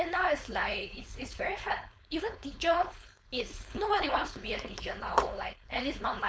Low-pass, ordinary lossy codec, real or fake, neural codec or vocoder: none; none; fake; codec, 16 kHz, 4.8 kbps, FACodec